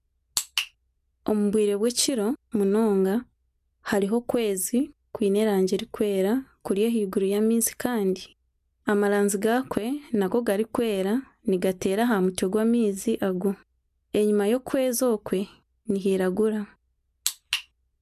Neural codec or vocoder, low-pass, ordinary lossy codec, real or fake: none; 14.4 kHz; MP3, 96 kbps; real